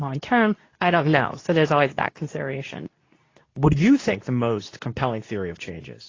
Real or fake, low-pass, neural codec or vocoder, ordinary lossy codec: fake; 7.2 kHz; codec, 24 kHz, 0.9 kbps, WavTokenizer, medium speech release version 2; AAC, 32 kbps